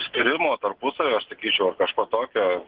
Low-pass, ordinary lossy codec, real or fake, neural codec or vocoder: 5.4 kHz; Opus, 16 kbps; real; none